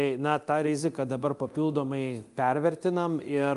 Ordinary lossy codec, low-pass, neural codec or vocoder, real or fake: Opus, 32 kbps; 10.8 kHz; codec, 24 kHz, 0.9 kbps, DualCodec; fake